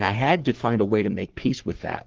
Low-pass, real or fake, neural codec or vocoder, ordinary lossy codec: 7.2 kHz; fake; codec, 44.1 kHz, 3.4 kbps, Pupu-Codec; Opus, 16 kbps